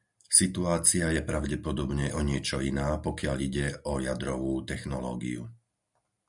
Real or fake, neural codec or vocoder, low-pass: real; none; 10.8 kHz